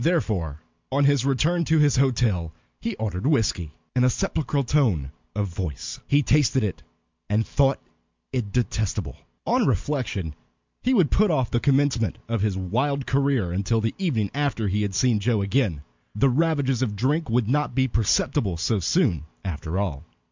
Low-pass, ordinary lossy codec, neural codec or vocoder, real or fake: 7.2 kHz; MP3, 64 kbps; none; real